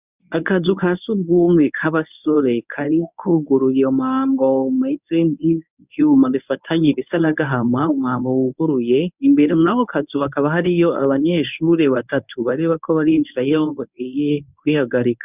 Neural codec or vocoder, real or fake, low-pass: codec, 24 kHz, 0.9 kbps, WavTokenizer, medium speech release version 1; fake; 3.6 kHz